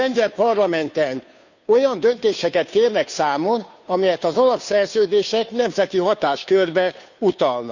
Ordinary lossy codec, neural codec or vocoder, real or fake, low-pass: none; codec, 16 kHz, 2 kbps, FunCodec, trained on Chinese and English, 25 frames a second; fake; 7.2 kHz